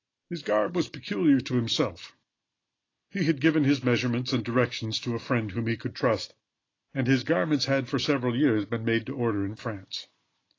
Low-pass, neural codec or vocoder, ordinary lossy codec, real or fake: 7.2 kHz; none; AAC, 32 kbps; real